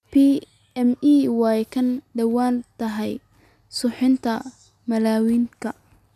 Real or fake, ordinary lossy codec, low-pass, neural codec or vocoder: real; none; 14.4 kHz; none